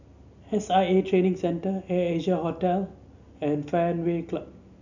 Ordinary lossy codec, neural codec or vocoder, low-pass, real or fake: none; none; 7.2 kHz; real